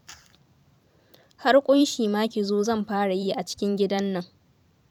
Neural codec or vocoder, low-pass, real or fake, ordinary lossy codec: vocoder, 44.1 kHz, 128 mel bands every 512 samples, BigVGAN v2; 19.8 kHz; fake; none